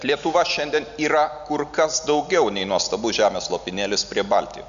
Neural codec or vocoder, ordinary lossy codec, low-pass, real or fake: none; MP3, 96 kbps; 7.2 kHz; real